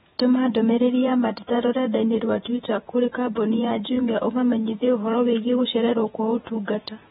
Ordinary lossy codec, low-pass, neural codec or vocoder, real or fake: AAC, 16 kbps; 19.8 kHz; vocoder, 48 kHz, 128 mel bands, Vocos; fake